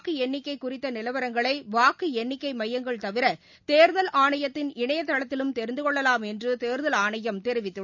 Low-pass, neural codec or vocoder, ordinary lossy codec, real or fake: 7.2 kHz; none; none; real